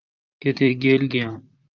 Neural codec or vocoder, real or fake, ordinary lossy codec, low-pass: vocoder, 44.1 kHz, 128 mel bands, Pupu-Vocoder; fake; Opus, 32 kbps; 7.2 kHz